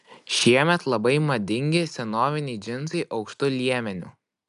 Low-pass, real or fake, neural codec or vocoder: 10.8 kHz; real; none